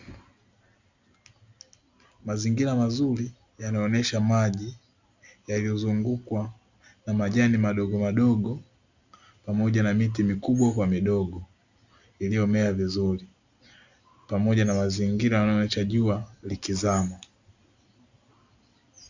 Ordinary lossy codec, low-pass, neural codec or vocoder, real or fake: Opus, 64 kbps; 7.2 kHz; none; real